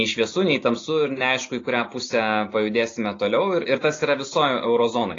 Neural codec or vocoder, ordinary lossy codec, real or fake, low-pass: none; AAC, 32 kbps; real; 7.2 kHz